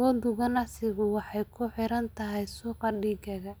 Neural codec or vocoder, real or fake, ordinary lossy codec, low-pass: none; real; none; none